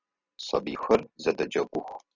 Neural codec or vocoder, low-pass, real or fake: none; 7.2 kHz; real